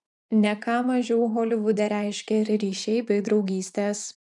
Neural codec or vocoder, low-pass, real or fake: vocoder, 24 kHz, 100 mel bands, Vocos; 10.8 kHz; fake